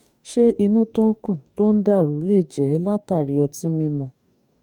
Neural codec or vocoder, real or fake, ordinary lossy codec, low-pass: codec, 44.1 kHz, 2.6 kbps, DAC; fake; none; 19.8 kHz